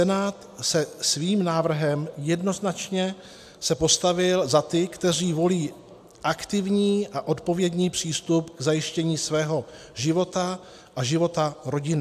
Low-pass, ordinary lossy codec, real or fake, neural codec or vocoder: 14.4 kHz; AAC, 96 kbps; real; none